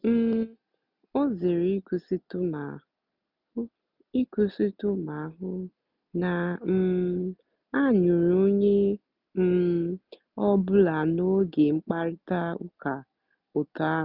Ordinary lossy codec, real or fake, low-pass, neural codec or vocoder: none; real; 5.4 kHz; none